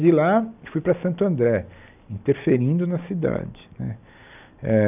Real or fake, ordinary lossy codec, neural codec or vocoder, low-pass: real; none; none; 3.6 kHz